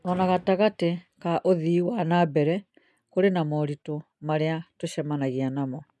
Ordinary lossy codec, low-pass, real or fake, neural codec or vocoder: none; none; real; none